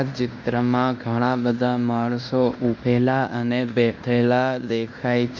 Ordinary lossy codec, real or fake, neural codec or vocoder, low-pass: none; fake; codec, 16 kHz in and 24 kHz out, 0.9 kbps, LongCat-Audio-Codec, fine tuned four codebook decoder; 7.2 kHz